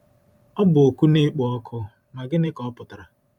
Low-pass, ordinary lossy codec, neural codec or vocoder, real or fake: 19.8 kHz; none; vocoder, 44.1 kHz, 128 mel bands every 512 samples, BigVGAN v2; fake